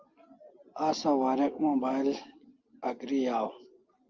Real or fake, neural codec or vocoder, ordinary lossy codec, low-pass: real; none; Opus, 32 kbps; 7.2 kHz